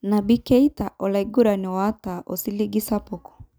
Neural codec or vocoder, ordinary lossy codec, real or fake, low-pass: none; none; real; none